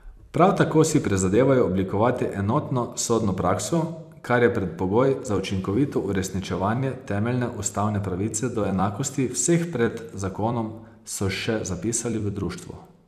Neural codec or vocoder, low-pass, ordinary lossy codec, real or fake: vocoder, 44.1 kHz, 128 mel bands every 256 samples, BigVGAN v2; 14.4 kHz; none; fake